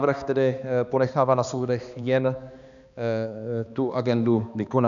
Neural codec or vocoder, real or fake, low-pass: codec, 16 kHz, 2 kbps, X-Codec, HuBERT features, trained on balanced general audio; fake; 7.2 kHz